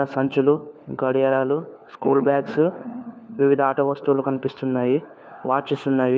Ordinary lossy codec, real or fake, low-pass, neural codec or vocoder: none; fake; none; codec, 16 kHz, 4 kbps, FunCodec, trained on LibriTTS, 50 frames a second